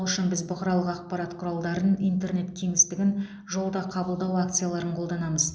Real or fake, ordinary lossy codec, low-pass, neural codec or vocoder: real; none; none; none